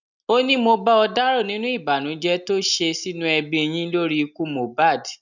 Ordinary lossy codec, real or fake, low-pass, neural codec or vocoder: none; real; 7.2 kHz; none